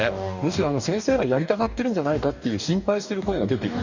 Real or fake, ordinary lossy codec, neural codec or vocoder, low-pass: fake; none; codec, 44.1 kHz, 2.6 kbps, DAC; 7.2 kHz